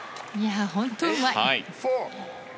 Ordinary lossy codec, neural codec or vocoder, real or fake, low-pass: none; none; real; none